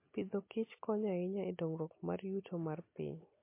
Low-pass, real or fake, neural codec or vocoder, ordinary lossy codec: 3.6 kHz; real; none; MP3, 24 kbps